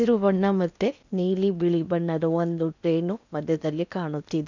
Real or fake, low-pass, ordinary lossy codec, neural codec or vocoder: fake; 7.2 kHz; none; codec, 16 kHz in and 24 kHz out, 0.8 kbps, FocalCodec, streaming, 65536 codes